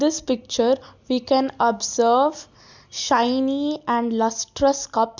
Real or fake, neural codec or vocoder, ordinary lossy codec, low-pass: real; none; none; 7.2 kHz